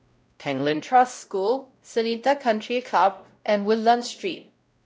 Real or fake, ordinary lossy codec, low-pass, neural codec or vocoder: fake; none; none; codec, 16 kHz, 0.5 kbps, X-Codec, WavLM features, trained on Multilingual LibriSpeech